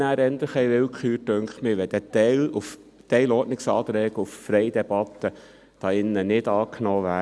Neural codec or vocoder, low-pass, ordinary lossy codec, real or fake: none; none; none; real